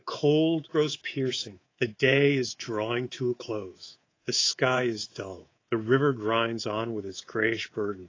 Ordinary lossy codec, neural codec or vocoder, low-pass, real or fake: AAC, 32 kbps; codec, 24 kHz, 3.1 kbps, DualCodec; 7.2 kHz; fake